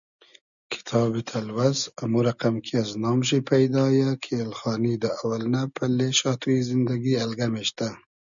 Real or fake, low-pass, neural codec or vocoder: real; 7.2 kHz; none